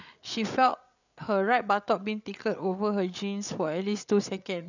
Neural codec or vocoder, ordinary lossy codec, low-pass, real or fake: codec, 16 kHz, 8 kbps, FreqCodec, larger model; none; 7.2 kHz; fake